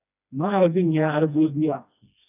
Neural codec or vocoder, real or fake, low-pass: codec, 16 kHz, 1 kbps, FreqCodec, smaller model; fake; 3.6 kHz